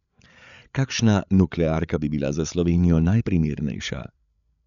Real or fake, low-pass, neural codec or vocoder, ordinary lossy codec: fake; 7.2 kHz; codec, 16 kHz, 8 kbps, FreqCodec, larger model; none